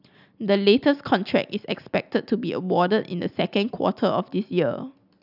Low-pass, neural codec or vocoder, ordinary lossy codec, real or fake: 5.4 kHz; none; none; real